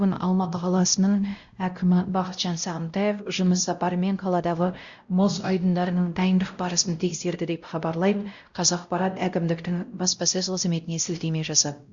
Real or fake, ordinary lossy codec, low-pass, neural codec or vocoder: fake; Opus, 64 kbps; 7.2 kHz; codec, 16 kHz, 0.5 kbps, X-Codec, WavLM features, trained on Multilingual LibriSpeech